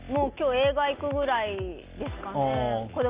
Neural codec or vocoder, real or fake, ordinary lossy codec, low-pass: none; real; Opus, 32 kbps; 3.6 kHz